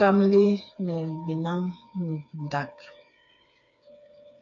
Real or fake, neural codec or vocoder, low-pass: fake; codec, 16 kHz, 4 kbps, FreqCodec, smaller model; 7.2 kHz